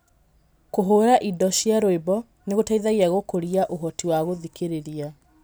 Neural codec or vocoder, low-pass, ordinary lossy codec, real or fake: none; none; none; real